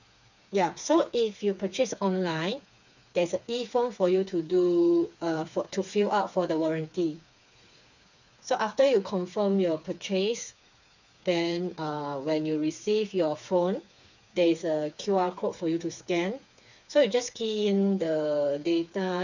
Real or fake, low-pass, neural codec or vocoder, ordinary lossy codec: fake; 7.2 kHz; codec, 16 kHz, 4 kbps, FreqCodec, smaller model; none